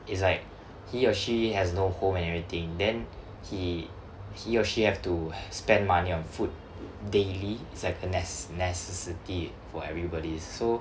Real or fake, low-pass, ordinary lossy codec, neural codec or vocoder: real; none; none; none